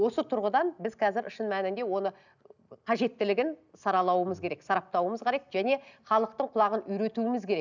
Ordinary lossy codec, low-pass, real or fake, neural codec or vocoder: none; 7.2 kHz; real; none